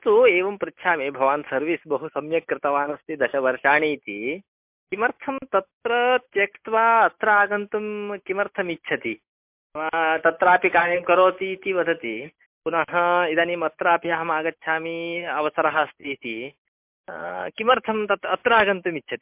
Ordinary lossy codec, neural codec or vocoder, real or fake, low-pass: MP3, 32 kbps; none; real; 3.6 kHz